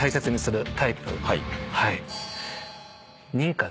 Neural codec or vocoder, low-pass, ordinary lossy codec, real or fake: none; none; none; real